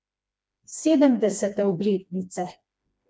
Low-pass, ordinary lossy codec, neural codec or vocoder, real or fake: none; none; codec, 16 kHz, 2 kbps, FreqCodec, smaller model; fake